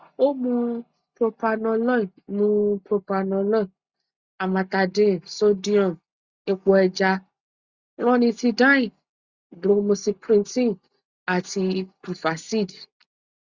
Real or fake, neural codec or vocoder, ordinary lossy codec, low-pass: real; none; Opus, 64 kbps; 7.2 kHz